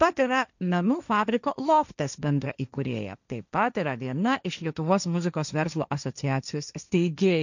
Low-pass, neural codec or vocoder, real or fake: 7.2 kHz; codec, 16 kHz, 1.1 kbps, Voila-Tokenizer; fake